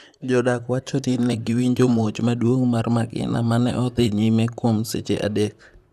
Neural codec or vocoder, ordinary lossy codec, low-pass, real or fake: vocoder, 44.1 kHz, 128 mel bands, Pupu-Vocoder; none; 14.4 kHz; fake